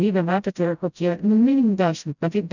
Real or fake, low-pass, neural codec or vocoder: fake; 7.2 kHz; codec, 16 kHz, 0.5 kbps, FreqCodec, smaller model